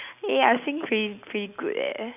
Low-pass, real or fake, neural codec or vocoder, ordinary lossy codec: 3.6 kHz; real; none; none